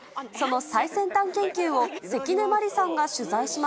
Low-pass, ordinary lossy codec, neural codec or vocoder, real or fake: none; none; none; real